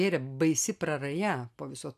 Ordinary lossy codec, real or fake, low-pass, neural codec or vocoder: AAC, 96 kbps; real; 14.4 kHz; none